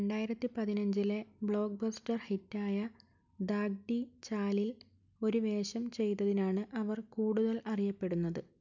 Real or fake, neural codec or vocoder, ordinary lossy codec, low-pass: real; none; none; 7.2 kHz